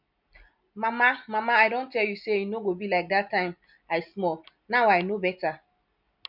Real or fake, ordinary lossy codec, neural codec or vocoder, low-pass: real; none; none; 5.4 kHz